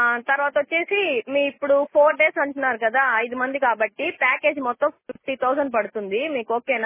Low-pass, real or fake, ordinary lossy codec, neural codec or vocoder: 3.6 kHz; real; MP3, 16 kbps; none